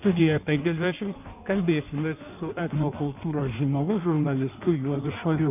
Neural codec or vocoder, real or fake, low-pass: codec, 16 kHz in and 24 kHz out, 1.1 kbps, FireRedTTS-2 codec; fake; 3.6 kHz